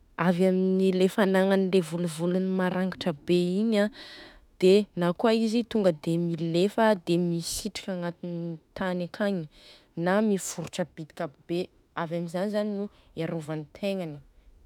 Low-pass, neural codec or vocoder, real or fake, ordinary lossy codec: 19.8 kHz; autoencoder, 48 kHz, 32 numbers a frame, DAC-VAE, trained on Japanese speech; fake; none